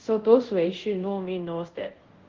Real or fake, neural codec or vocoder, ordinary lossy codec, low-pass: fake; codec, 24 kHz, 0.5 kbps, DualCodec; Opus, 16 kbps; 7.2 kHz